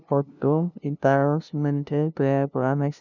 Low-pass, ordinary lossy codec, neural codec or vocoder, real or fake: 7.2 kHz; none; codec, 16 kHz, 0.5 kbps, FunCodec, trained on LibriTTS, 25 frames a second; fake